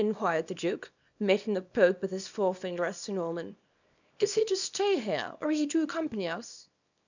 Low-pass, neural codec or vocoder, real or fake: 7.2 kHz; codec, 24 kHz, 0.9 kbps, WavTokenizer, small release; fake